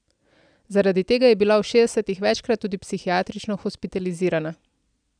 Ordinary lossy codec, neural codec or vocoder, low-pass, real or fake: none; none; 9.9 kHz; real